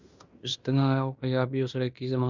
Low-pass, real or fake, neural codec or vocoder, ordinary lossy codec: 7.2 kHz; fake; codec, 16 kHz in and 24 kHz out, 0.9 kbps, LongCat-Audio-Codec, fine tuned four codebook decoder; Opus, 64 kbps